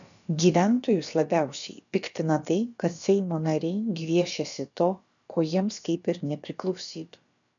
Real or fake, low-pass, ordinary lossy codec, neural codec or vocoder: fake; 7.2 kHz; MP3, 96 kbps; codec, 16 kHz, about 1 kbps, DyCAST, with the encoder's durations